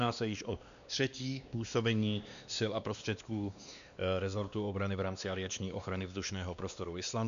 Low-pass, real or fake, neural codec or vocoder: 7.2 kHz; fake; codec, 16 kHz, 2 kbps, X-Codec, WavLM features, trained on Multilingual LibriSpeech